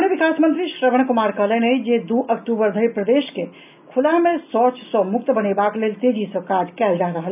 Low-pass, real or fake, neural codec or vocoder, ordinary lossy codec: 3.6 kHz; real; none; none